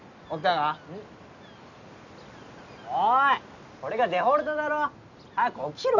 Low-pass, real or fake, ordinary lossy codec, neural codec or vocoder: 7.2 kHz; real; none; none